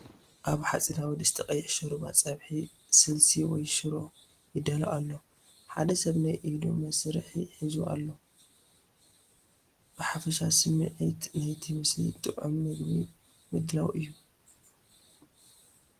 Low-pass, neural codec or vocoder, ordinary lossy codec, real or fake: 14.4 kHz; none; Opus, 24 kbps; real